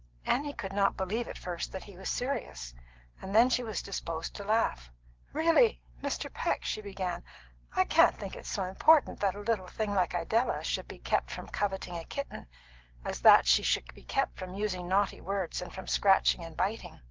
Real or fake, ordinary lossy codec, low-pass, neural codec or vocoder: real; Opus, 16 kbps; 7.2 kHz; none